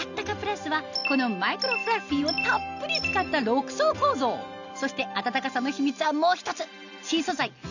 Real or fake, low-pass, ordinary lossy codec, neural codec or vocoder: real; 7.2 kHz; none; none